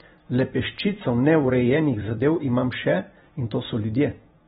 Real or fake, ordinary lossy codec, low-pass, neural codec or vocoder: fake; AAC, 16 kbps; 19.8 kHz; vocoder, 44.1 kHz, 128 mel bands every 256 samples, BigVGAN v2